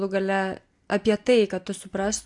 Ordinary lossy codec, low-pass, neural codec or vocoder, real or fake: AAC, 64 kbps; 10.8 kHz; none; real